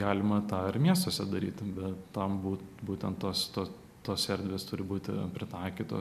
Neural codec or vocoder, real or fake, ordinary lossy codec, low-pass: none; real; MP3, 96 kbps; 14.4 kHz